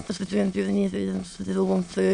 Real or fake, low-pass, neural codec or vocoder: fake; 9.9 kHz; autoencoder, 22.05 kHz, a latent of 192 numbers a frame, VITS, trained on many speakers